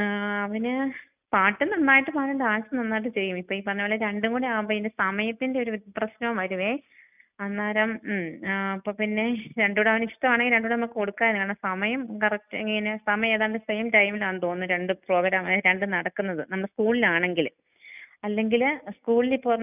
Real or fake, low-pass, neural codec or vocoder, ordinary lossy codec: real; 3.6 kHz; none; none